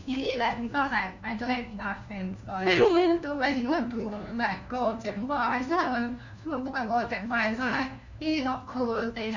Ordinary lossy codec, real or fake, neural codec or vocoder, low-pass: none; fake; codec, 16 kHz, 1 kbps, FunCodec, trained on LibriTTS, 50 frames a second; 7.2 kHz